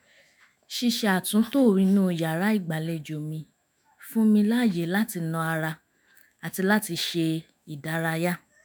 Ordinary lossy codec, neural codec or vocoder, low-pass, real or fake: none; autoencoder, 48 kHz, 128 numbers a frame, DAC-VAE, trained on Japanese speech; none; fake